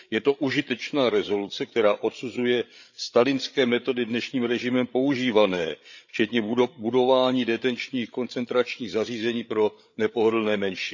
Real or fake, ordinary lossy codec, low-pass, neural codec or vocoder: fake; none; 7.2 kHz; codec, 16 kHz, 8 kbps, FreqCodec, larger model